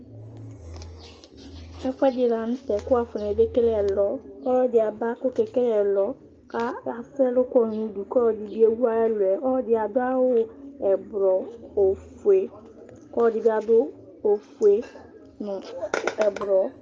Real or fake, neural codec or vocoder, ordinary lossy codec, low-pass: real; none; Opus, 24 kbps; 7.2 kHz